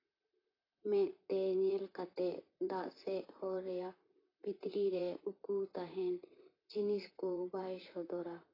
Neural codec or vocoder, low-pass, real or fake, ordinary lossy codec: vocoder, 44.1 kHz, 128 mel bands, Pupu-Vocoder; 5.4 kHz; fake; AAC, 24 kbps